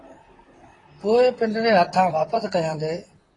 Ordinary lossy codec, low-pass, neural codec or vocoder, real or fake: AAC, 32 kbps; 10.8 kHz; vocoder, 44.1 kHz, 128 mel bands, Pupu-Vocoder; fake